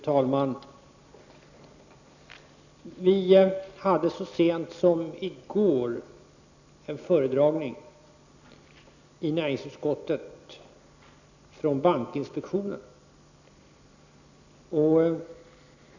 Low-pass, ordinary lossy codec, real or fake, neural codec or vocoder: 7.2 kHz; none; real; none